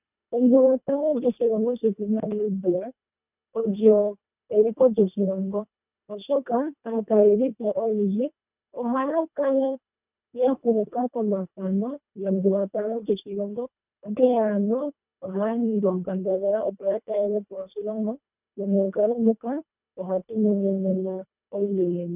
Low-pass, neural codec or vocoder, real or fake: 3.6 kHz; codec, 24 kHz, 1.5 kbps, HILCodec; fake